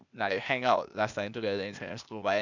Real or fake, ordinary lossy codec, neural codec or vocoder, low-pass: fake; none; codec, 16 kHz, 0.8 kbps, ZipCodec; 7.2 kHz